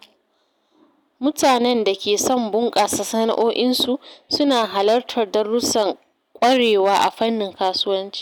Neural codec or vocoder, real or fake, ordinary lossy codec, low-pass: none; real; none; 19.8 kHz